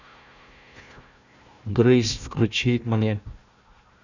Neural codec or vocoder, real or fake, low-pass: codec, 16 kHz, 1 kbps, FunCodec, trained on Chinese and English, 50 frames a second; fake; 7.2 kHz